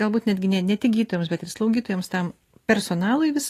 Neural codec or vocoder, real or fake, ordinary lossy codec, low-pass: none; real; AAC, 48 kbps; 14.4 kHz